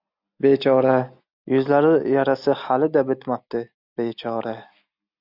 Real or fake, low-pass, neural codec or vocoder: real; 5.4 kHz; none